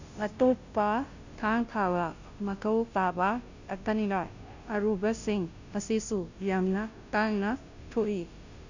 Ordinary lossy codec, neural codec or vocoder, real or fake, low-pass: none; codec, 16 kHz, 0.5 kbps, FunCodec, trained on Chinese and English, 25 frames a second; fake; 7.2 kHz